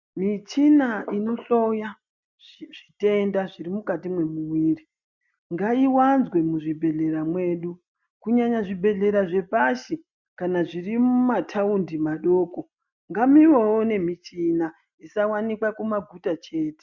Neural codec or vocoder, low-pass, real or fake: none; 7.2 kHz; real